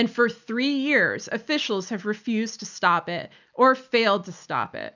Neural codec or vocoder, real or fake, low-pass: none; real; 7.2 kHz